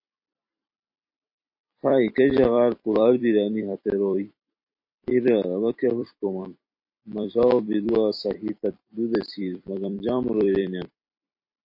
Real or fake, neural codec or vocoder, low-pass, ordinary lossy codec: fake; vocoder, 44.1 kHz, 128 mel bands every 256 samples, BigVGAN v2; 5.4 kHz; MP3, 32 kbps